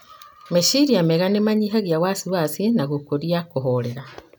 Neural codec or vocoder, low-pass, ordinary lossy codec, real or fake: none; none; none; real